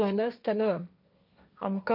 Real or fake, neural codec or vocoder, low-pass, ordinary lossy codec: fake; codec, 16 kHz, 1.1 kbps, Voila-Tokenizer; 5.4 kHz; none